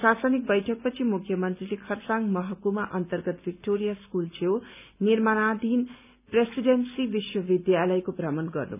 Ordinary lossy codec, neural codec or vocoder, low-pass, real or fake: none; none; 3.6 kHz; real